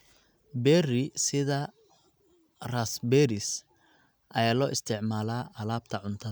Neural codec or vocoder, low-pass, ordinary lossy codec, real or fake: none; none; none; real